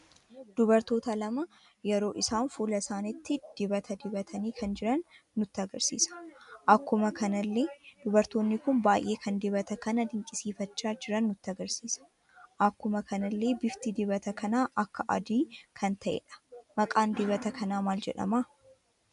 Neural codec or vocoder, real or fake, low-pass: none; real; 10.8 kHz